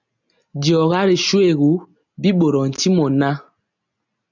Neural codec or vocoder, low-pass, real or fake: none; 7.2 kHz; real